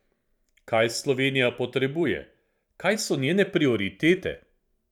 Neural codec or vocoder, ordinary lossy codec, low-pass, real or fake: vocoder, 44.1 kHz, 128 mel bands every 512 samples, BigVGAN v2; none; 19.8 kHz; fake